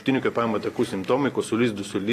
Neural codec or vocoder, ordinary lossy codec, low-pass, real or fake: none; AAC, 48 kbps; 14.4 kHz; real